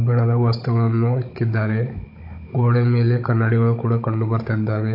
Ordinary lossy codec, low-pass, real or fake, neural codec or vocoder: AAC, 32 kbps; 5.4 kHz; fake; codec, 16 kHz, 16 kbps, FunCodec, trained on Chinese and English, 50 frames a second